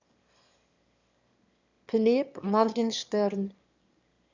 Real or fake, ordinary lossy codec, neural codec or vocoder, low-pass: fake; Opus, 64 kbps; autoencoder, 22.05 kHz, a latent of 192 numbers a frame, VITS, trained on one speaker; 7.2 kHz